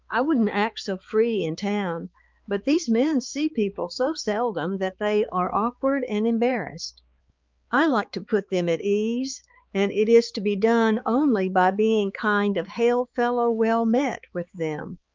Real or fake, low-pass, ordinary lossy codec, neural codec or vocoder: fake; 7.2 kHz; Opus, 24 kbps; codec, 16 kHz, 4 kbps, X-Codec, HuBERT features, trained on balanced general audio